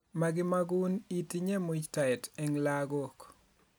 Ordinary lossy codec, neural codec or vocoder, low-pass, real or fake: none; none; none; real